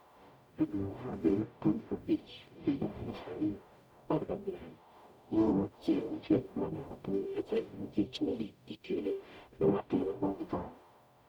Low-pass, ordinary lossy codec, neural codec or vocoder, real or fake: 19.8 kHz; none; codec, 44.1 kHz, 0.9 kbps, DAC; fake